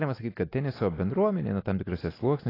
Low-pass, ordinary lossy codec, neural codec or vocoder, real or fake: 5.4 kHz; AAC, 24 kbps; none; real